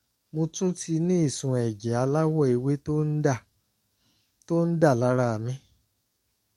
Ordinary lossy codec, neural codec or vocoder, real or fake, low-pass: MP3, 64 kbps; codec, 44.1 kHz, 7.8 kbps, DAC; fake; 19.8 kHz